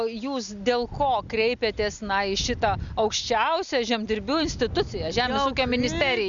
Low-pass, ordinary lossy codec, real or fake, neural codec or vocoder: 7.2 kHz; Opus, 64 kbps; real; none